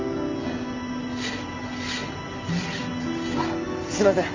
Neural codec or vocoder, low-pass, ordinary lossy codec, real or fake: none; 7.2 kHz; AAC, 48 kbps; real